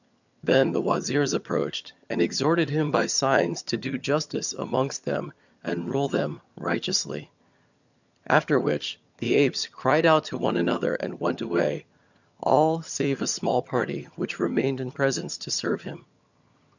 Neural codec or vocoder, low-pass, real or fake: vocoder, 22.05 kHz, 80 mel bands, HiFi-GAN; 7.2 kHz; fake